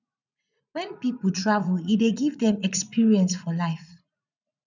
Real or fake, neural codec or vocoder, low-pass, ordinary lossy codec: fake; vocoder, 44.1 kHz, 80 mel bands, Vocos; 7.2 kHz; none